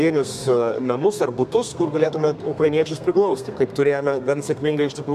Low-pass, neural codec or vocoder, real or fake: 14.4 kHz; codec, 32 kHz, 1.9 kbps, SNAC; fake